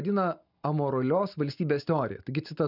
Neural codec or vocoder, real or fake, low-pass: none; real; 5.4 kHz